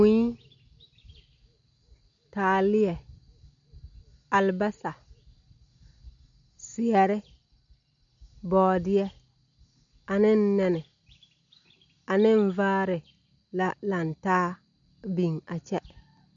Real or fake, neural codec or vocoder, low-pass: real; none; 7.2 kHz